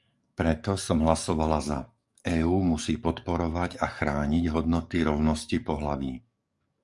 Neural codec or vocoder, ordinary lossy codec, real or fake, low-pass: codec, 44.1 kHz, 7.8 kbps, DAC; Opus, 64 kbps; fake; 10.8 kHz